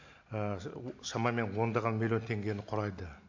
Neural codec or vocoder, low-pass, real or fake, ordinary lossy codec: none; 7.2 kHz; real; AAC, 48 kbps